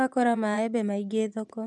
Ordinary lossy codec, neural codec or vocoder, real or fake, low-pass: none; vocoder, 24 kHz, 100 mel bands, Vocos; fake; none